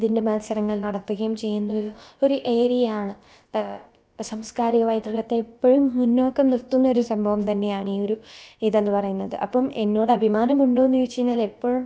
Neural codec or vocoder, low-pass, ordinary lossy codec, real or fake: codec, 16 kHz, about 1 kbps, DyCAST, with the encoder's durations; none; none; fake